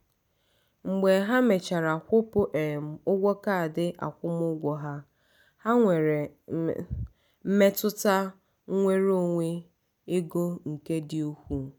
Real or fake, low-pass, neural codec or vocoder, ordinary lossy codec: real; none; none; none